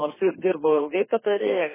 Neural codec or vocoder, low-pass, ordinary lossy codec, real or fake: codec, 32 kHz, 1.9 kbps, SNAC; 3.6 kHz; MP3, 16 kbps; fake